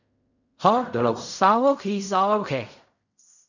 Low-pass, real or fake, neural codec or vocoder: 7.2 kHz; fake; codec, 16 kHz in and 24 kHz out, 0.4 kbps, LongCat-Audio-Codec, fine tuned four codebook decoder